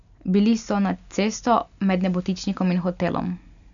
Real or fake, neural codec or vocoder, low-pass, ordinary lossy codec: real; none; 7.2 kHz; none